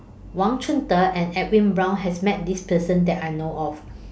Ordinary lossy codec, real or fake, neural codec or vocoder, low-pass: none; real; none; none